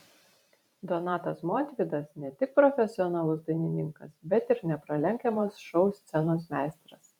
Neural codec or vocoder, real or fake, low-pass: vocoder, 44.1 kHz, 128 mel bands every 512 samples, BigVGAN v2; fake; 19.8 kHz